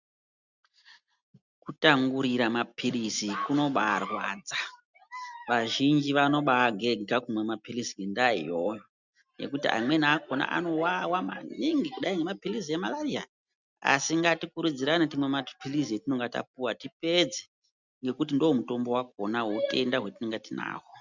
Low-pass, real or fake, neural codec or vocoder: 7.2 kHz; real; none